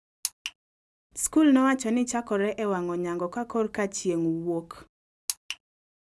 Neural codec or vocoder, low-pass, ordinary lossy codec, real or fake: none; none; none; real